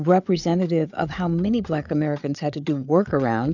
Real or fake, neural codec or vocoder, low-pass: fake; codec, 16 kHz, 8 kbps, FreqCodec, larger model; 7.2 kHz